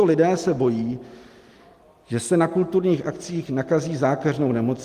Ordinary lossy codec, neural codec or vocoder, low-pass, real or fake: Opus, 24 kbps; none; 14.4 kHz; real